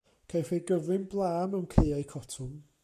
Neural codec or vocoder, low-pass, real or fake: codec, 44.1 kHz, 7.8 kbps, Pupu-Codec; 14.4 kHz; fake